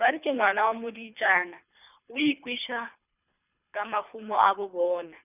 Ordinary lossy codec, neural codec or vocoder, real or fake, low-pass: none; codec, 24 kHz, 3 kbps, HILCodec; fake; 3.6 kHz